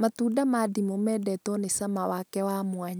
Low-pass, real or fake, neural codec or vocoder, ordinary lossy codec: none; real; none; none